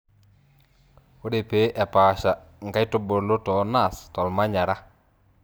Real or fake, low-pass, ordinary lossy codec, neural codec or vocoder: fake; none; none; vocoder, 44.1 kHz, 128 mel bands every 256 samples, BigVGAN v2